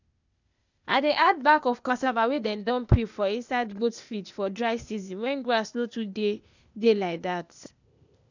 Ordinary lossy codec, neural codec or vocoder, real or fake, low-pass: none; codec, 16 kHz, 0.8 kbps, ZipCodec; fake; 7.2 kHz